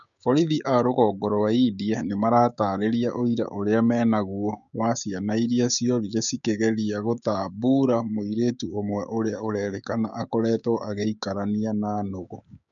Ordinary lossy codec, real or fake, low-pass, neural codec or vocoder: none; fake; 7.2 kHz; codec, 16 kHz, 6 kbps, DAC